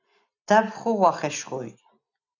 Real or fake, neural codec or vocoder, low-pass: real; none; 7.2 kHz